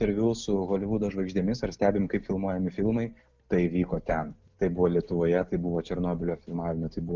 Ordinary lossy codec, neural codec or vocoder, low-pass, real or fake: Opus, 24 kbps; none; 7.2 kHz; real